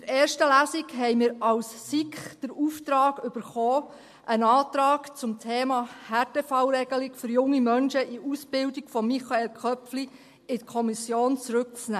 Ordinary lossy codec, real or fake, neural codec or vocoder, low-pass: MP3, 64 kbps; real; none; 14.4 kHz